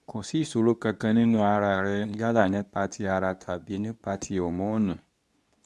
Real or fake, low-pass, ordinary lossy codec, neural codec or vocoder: fake; none; none; codec, 24 kHz, 0.9 kbps, WavTokenizer, medium speech release version 2